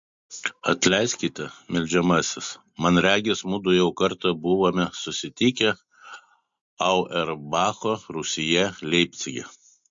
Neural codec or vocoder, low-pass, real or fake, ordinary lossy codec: none; 7.2 kHz; real; MP3, 48 kbps